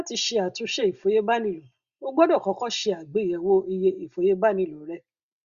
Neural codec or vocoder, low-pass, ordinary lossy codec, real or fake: none; 7.2 kHz; Opus, 64 kbps; real